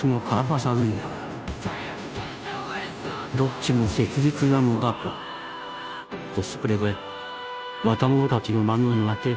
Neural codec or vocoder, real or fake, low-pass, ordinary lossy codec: codec, 16 kHz, 0.5 kbps, FunCodec, trained on Chinese and English, 25 frames a second; fake; none; none